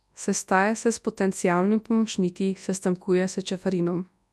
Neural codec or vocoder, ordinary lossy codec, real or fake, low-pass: codec, 24 kHz, 0.9 kbps, WavTokenizer, large speech release; none; fake; none